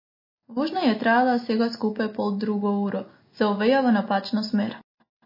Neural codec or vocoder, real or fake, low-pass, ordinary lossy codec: none; real; 5.4 kHz; MP3, 24 kbps